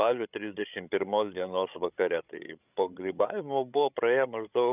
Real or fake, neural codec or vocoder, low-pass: fake; codec, 16 kHz, 16 kbps, FreqCodec, larger model; 3.6 kHz